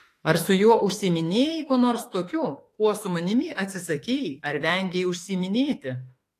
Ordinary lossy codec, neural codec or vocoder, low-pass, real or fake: AAC, 48 kbps; autoencoder, 48 kHz, 32 numbers a frame, DAC-VAE, trained on Japanese speech; 14.4 kHz; fake